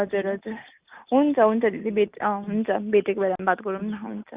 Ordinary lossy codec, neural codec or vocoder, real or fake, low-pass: Opus, 64 kbps; none; real; 3.6 kHz